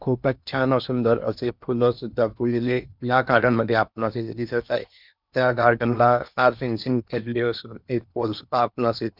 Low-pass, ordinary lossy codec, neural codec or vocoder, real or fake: 5.4 kHz; none; codec, 16 kHz in and 24 kHz out, 0.8 kbps, FocalCodec, streaming, 65536 codes; fake